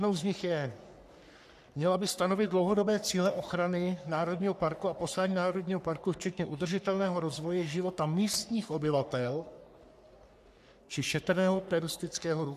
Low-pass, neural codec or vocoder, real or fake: 14.4 kHz; codec, 44.1 kHz, 3.4 kbps, Pupu-Codec; fake